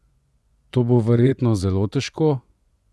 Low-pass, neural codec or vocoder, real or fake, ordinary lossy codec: none; vocoder, 24 kHz, 100 mel bands, Vocos; fake; none